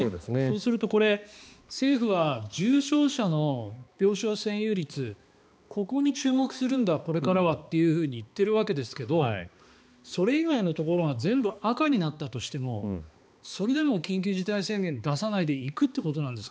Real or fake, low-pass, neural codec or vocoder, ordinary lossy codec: fake; none; codec, 16 kHz, 2 kbps, X-Codec, HuBERT features, trained on balanced general audio; none